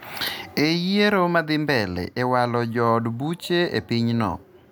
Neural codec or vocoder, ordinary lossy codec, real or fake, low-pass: none; none; real; none